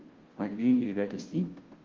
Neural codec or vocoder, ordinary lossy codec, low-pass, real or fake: codec, 16 kHz, 0.5 kbps, FunCodec, trained on Chinese and English, 25 frames a second; Opus, 16 kbps; 7.2 kHz; fake